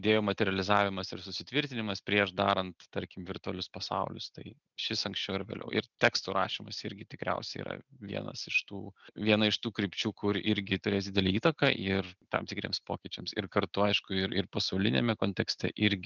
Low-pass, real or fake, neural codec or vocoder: 7.2 kHz; real; none